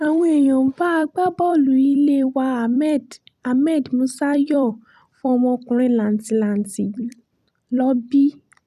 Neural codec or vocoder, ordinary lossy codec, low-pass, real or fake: vocoder, 44.1 kHz, 128 mel bands every 256 samples, BigVGAN v2; none; 14.4 kHz; fake